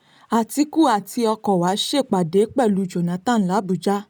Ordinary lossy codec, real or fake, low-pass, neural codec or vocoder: none; real; none; none